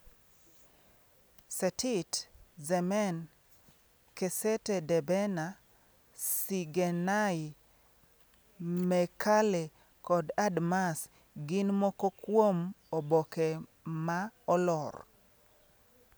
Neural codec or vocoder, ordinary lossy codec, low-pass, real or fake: none; none; none; real